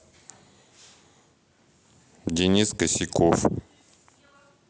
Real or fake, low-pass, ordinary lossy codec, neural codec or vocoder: real; none; none; none